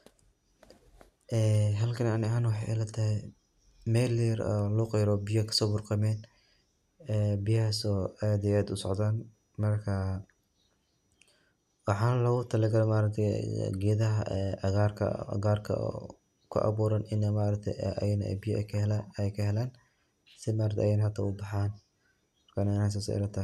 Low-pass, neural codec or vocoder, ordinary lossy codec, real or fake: 14.4 kHz; none; none; real